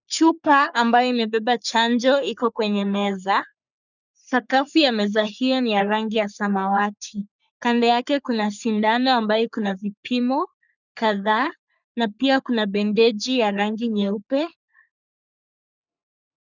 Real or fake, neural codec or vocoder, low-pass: fake; codec, 44.1 kHz, 3.4 kbps, Pupu-Codec; 7.2 kHz